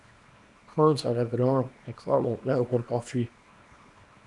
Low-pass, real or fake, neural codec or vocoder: 10.8 kHz; fake; codec, 24 kHz, 0.9 kbps, WavTokenizer, small release